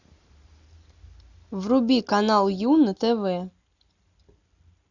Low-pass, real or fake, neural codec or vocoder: 7.2 kHz; real; none